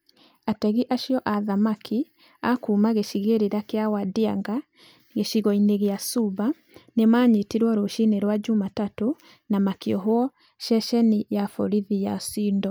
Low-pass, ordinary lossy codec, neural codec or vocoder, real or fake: none; none; none; real